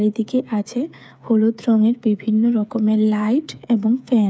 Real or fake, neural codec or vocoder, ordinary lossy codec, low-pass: fake; codec, 16 kHz, 8 kbps, FreqCodec, smaller model; none; none